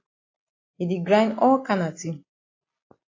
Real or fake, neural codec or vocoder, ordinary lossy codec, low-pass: real; none; AAC, 32 kbps; 7.2 kHz